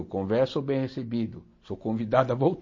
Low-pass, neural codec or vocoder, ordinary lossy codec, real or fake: 7.2 kHz; none; MP3, 32 kbps; real